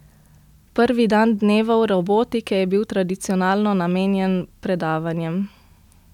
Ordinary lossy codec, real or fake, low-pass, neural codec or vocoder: none; real; 19.8 kHz; none